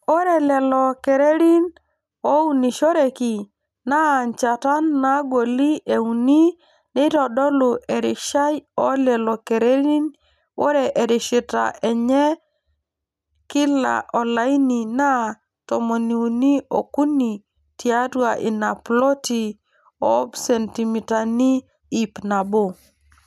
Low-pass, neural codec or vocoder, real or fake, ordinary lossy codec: 14.4 kHz; none; real; none